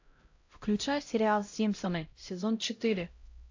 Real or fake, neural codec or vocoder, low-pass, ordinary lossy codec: fake; codec, 16 kHz, 0.5 kbps, X-Codec, HuBERT features, trained on LibriSpeech; 7.2 kHz; AAC, 48 kbps